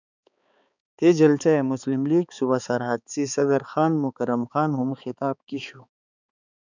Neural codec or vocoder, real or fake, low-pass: codec, 16 kHz, 4 kbps, X-Codec, HuBERT features, trained on balanced general audio; fake; 7.2 kHz